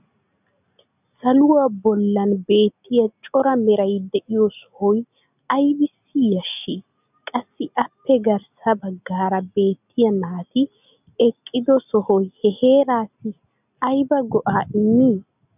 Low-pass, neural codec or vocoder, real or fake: 3.6 kHz; none; real